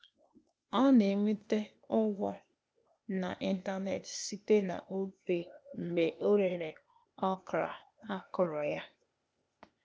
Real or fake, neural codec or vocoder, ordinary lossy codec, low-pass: fake; codec, 16 kHz, 0.8 kbps, ZipCodec; none; none